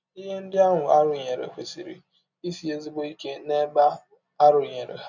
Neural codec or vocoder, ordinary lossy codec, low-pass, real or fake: none; none; 7.2 kHz; real